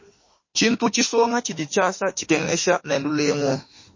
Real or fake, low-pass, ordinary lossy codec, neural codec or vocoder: fake; 7.2 kHz; MP3, 32 kbps; autoencoder, 48 kHz, 32 numbers a frame, DAC-VAE, trained on Japanese speech